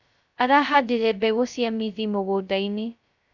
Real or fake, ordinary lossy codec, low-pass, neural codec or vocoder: fake; none; 7.2 kHz; codec, 16 kHz, 0.2 kbps, FocalCodec